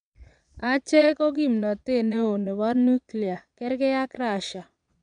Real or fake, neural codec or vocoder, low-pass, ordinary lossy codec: fake; vocoder, 22.05 kHz, 80 mel bands, Vocos; 9.9 kHz; none